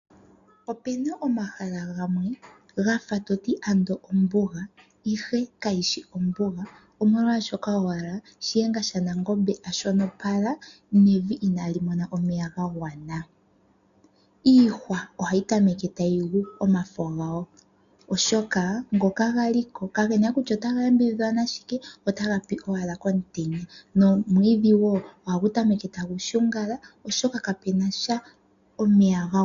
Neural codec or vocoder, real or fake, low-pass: none; real; 7.2 kHz